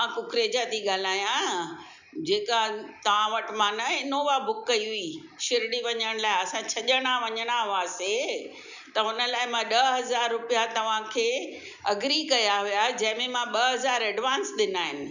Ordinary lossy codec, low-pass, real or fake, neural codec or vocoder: none; 7.2 kHz; real; none